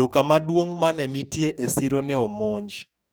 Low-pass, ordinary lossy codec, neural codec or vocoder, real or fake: none; none; codec, 44.1 kHz, 2.6 kbps, DAC; fake